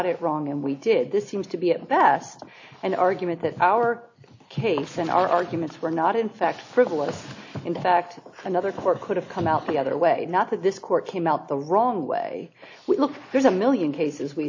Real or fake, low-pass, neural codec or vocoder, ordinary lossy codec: real; 7.2 kHz; none; AAC, 48 kbps